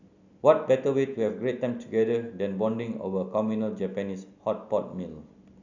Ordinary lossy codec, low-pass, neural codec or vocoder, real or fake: none; 7.2 kHz; none; real